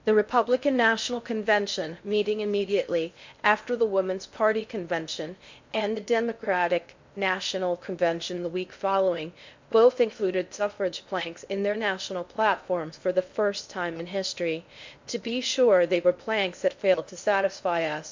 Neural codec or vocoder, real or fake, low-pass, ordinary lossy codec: codec, 16 kHz in and 24 kHz out, 0.6 kbps, FocalCodec, streaming, 4096 codes; fake; 7.2 kHz; MP3, 64 kbps